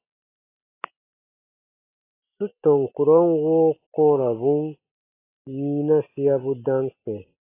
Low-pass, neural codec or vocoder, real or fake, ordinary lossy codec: 3.6 kHz; none; real; AAC, 24 kbps